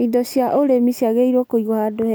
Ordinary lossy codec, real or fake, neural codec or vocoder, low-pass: none; real; none; none